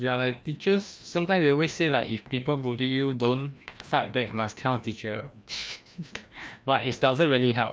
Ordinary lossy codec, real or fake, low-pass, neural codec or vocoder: none; fake; none; codec, 16 kHz, 1 kbps, FreqCodec, larger model